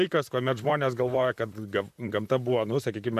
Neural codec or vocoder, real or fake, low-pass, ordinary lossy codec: vocoder, 44.1 kHz, 128 mel bands, Pupu-Vocoder; fake; 14.4 kHz; MP3, 96 kbps